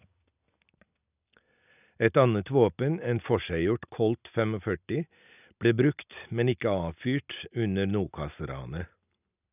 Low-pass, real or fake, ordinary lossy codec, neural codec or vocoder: 3.6 kHz; real; none; none